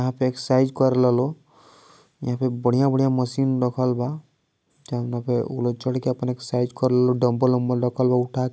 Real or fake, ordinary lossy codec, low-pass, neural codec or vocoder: real; none; none; none